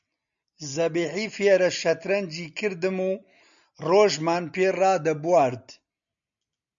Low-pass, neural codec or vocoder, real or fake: 7.2 kHz; none; real